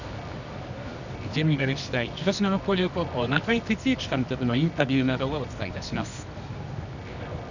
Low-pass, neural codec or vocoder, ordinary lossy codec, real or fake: 7.2 kHz; codec, 24 kHz, 0.9 kbps, WavTokenizer, medium music audio release; none; fake